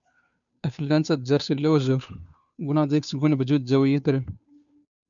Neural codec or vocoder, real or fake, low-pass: codec, 16 kHz, 2 kbps, FunCodec, trained on Chinese and English, 25 frames a second; fake; 7.2 kHz